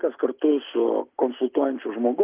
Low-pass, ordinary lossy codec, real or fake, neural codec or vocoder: 3.6 kHz; Opus, 24 kbps; real; none